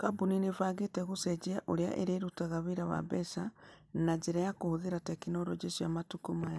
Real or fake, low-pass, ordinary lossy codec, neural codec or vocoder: real; none; none; none